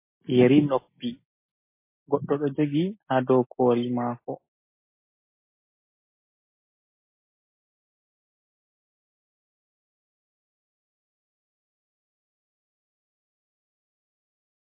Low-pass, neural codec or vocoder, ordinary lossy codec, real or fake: 3.6 kHz; none; MP3, 24 kbps; real